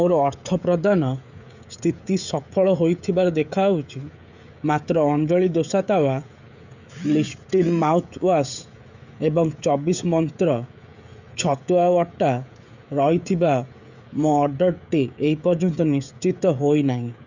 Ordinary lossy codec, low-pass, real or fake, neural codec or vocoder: none; 7.2 kHz; fake; codec, 16 kHz, 16 kbps, FreqCodec, smaller model